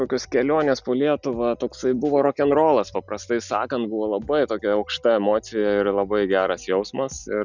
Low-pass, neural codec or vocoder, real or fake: 7.2 kHz; none; real